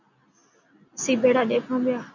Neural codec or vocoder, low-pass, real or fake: none; 7.2 kHz; real